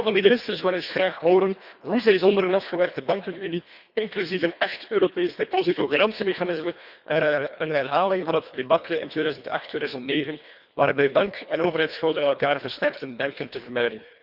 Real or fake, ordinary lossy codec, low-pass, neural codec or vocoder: fake; Opus, 64 kbps; 5.4 kHz; codec, 24 kHz, 1.5 kbps, HILCodec